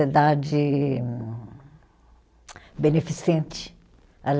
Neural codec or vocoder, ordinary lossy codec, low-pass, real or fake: none; none; none; real